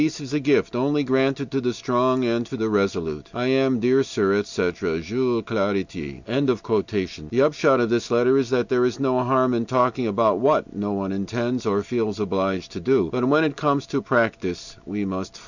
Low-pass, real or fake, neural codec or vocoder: 7.2 kHz; real; none